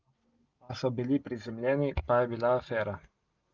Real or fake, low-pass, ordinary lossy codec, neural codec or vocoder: real; 7.2 kHz; Opus, 32 kbps; none